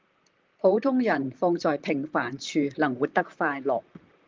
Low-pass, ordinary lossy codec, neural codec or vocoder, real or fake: 7.2 kHz; Opus, 32 kbps; vocoder, 44.1 kHz, 128 mel bands, Pupu-Vocoder; fake